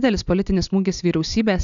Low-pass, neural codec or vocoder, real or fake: 7.2 kHz; none; real